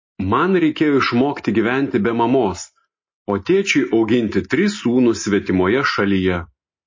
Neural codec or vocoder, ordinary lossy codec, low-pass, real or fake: none; MP3, 32 kbps; 7.2 kHz; real